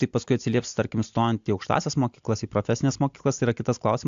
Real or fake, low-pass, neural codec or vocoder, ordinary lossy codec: real; 7.2 kHz; none; AAC, 64 kbps